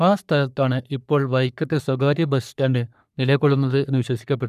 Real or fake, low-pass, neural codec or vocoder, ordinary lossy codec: fake; 14.4 kHz; codec, 44.1 kHz, 3.4 kbps, Pupu-Codec; none